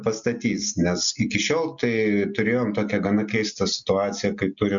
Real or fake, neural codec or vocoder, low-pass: real; none; 7.2 kHz